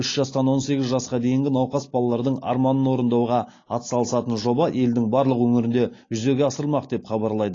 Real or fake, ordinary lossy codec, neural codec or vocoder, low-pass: real; AAC, 32 kbps; none; 7.2 kHz